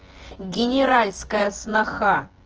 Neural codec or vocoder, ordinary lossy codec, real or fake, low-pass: vocoder, 24 kHz, 100 mel bands, Vocos; Opus, 16 kbps; fake; 7.2 kHz